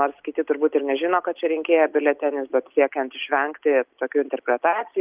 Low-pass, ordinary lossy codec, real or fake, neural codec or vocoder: 3.6 kHz; Opus, 32 kbps; real; none